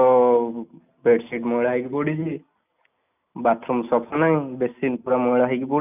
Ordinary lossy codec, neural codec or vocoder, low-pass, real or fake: none; none; 3.6 kHz; real